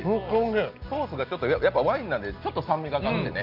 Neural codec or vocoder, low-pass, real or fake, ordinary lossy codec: none; 5.4 kHz; real; Opus, 24 kbps